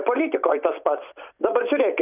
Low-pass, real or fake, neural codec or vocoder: 3.6 kHz; fake; vocoder, 44.1 kHz, 128 mel bands every 256 samples, BigVGAN v2